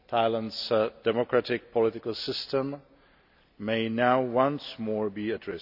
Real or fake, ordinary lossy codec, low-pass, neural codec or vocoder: real; none; 5.4 kHz; none